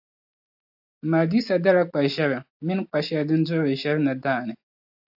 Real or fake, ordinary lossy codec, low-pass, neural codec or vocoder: real; AAC, 48 kbps; 5.4 kHz; none